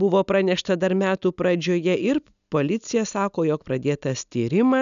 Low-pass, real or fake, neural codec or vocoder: 7.2 kHz; real; none